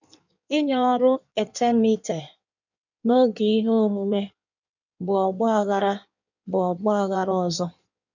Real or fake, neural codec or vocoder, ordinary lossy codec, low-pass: fake; codec, 16 kHz in and 24 kHz out, 1.1 kbps, FireRedTTS-2 codec; none; 7.2 kHz